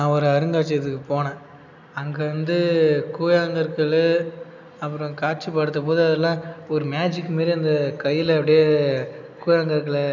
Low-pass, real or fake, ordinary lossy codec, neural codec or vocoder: 7.2 kHz; real; none; none